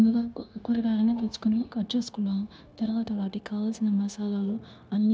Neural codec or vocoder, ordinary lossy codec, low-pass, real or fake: codec, 16 kHz, 0.9 kbps, LongCat-Audio-Codec; none; none; fake